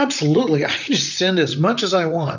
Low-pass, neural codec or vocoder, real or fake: 7.2 kHz; vocoder, 44.1 kHz, 128 mel bands, Pupu-Vocoder; fake